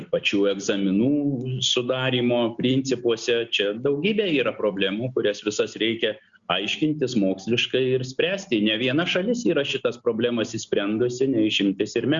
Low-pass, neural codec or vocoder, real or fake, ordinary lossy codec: 7.2 kHz; none; real; Opus, 64 kbps